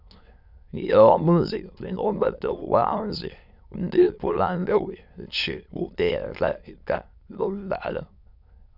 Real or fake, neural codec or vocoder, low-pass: fake; autoencoder, 22.05 kHz, a latent of 192 numbers a frame, VITS, trained on many speakers; 5.4 kHz